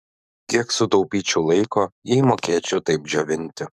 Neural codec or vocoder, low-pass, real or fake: codec, 44.1 kHz, 7.8 kbps, DAC; 14.4 kHz; fake